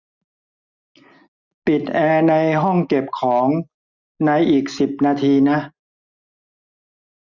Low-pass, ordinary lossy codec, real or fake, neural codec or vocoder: 7.2 kHz; none; real; none